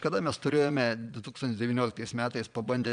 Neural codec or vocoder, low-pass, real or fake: vocoder, 22.05 kHz, 80 mel bands, Vocos; 9.9 kHz; fake